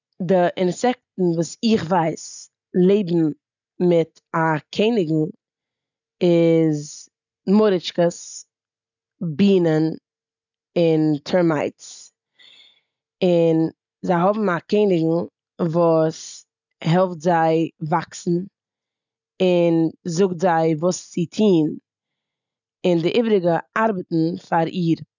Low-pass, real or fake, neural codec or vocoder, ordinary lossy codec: 7.2 kHz; real; none; none